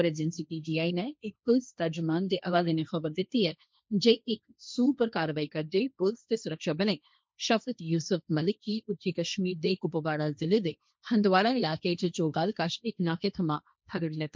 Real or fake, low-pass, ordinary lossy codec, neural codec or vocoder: fake; none; none; codec, 16 kHz, 1.1 kbps, Voila-Tokenizer